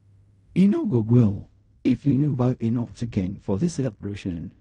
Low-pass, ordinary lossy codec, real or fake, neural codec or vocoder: 10.8 kHz; AAC, 48 kbps; fake; codec, 16 kHz in and 24 kHz out, 0.4 kbps, LongCat-Audio-Codec, fine tuned four codebook decoder